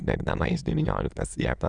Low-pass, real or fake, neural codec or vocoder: 9.9 kHz; fake; autoencoder, 22.05 kHz, a latent of 192 numbers a frame, VITS, trained on many speakers